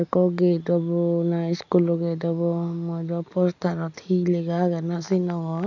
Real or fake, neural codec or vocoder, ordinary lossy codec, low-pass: real; none; none; 7.2 kHz